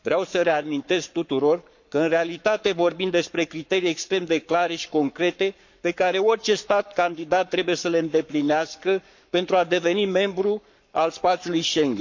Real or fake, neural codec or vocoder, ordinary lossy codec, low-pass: fake; codec, 44.1 kHz, 7.8 kbps, Pupu-Codec; none; 7.2 kHz